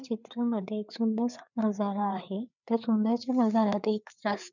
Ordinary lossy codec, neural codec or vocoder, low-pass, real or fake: none; codec, 16 kHz, 4 kbps, FreqCodec, larger model; 7.2 kHz; fake